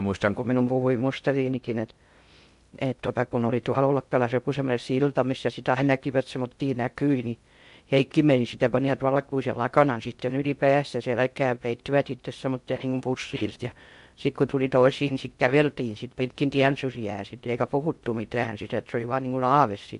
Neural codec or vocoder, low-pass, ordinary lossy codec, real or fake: codec, 16 kHz in and 24 kHz out, 0.6 kbps, FocalCodec, streaming, 2048 codes; 10.8 kHz; MP3, 64 kbps; fake